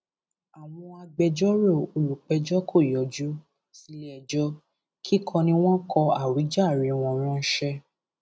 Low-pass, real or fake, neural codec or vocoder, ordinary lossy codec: none; real; none; none